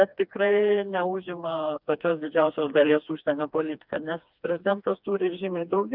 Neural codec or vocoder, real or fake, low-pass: codec, 16 kHz, 2 kbps, FreqCodec, smaller model; fake; 5.4 kHz